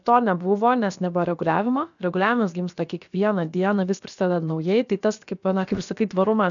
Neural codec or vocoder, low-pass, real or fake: codec, 16 kHz, about 1 kbps, DyCAST, with the encoder's durations; 7.2 kHz; fake